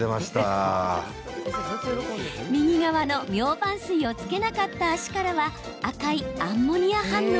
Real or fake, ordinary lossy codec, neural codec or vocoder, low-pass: real; none; none; none